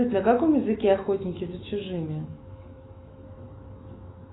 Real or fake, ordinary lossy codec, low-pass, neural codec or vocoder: fake; AAC, 16 kbps; 7.2 kHz; autoencoder, 48 kHz, 128 numbers a frame, DAC-VAE, trained on Japanese speech